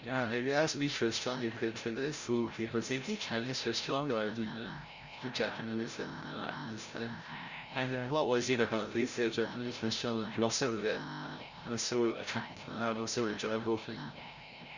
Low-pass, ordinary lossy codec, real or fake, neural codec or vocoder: 7.2 kHz; Opus, 64 kbps; fake; codec, 16 kHz, 0.5 kbps, FreqCodec, larger model